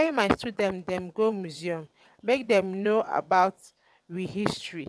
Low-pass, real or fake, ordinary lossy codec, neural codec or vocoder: none; fake; none; vocoder, 22.05 kHz, 80 mel bands, WaveNeXt